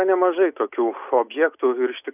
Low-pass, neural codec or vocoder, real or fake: 3.6 kHz; none; real